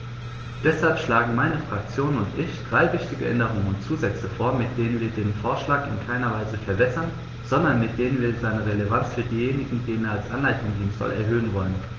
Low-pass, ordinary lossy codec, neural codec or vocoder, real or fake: 7.2 kHz; Opus, 16 kbps; none; real